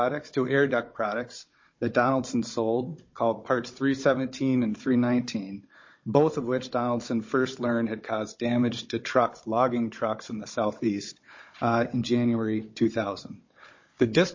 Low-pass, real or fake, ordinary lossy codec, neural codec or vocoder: 7.2 kHz; fake; MP3, 32 kbps; codec, 16 kHz, 16 kbps, FunCodec, trained on Chinese and English, 50 frames a second